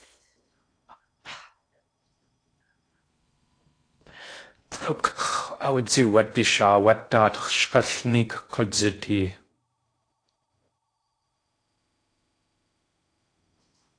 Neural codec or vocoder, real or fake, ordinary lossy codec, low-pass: codec, 16 kHz in and 24 kHz out, 0.6 kbps, FocalCodec, streaming, 4096 codes; fake; AAC, 48 kbps; 9.9 kHz